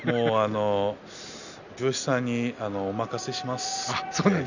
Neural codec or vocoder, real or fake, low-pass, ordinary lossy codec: none; real; 7.2 kHz; none